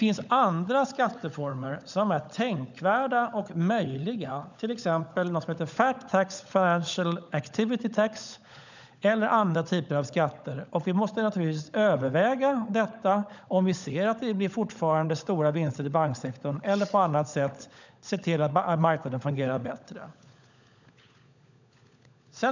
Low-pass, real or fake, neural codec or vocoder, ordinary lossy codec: 7.2 kHz; fake; codec, 16 kHz, 16 kbps, FunCodec, trained on LibriTTS, 50 frames a second; none